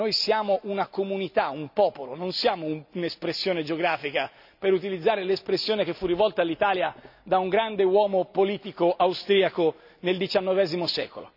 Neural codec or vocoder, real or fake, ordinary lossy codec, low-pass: none; real; none; 5.4 kHz